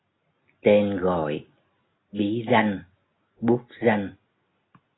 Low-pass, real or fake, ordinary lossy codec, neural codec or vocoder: 7.2 kHz; real; AAC, 16 kbps; none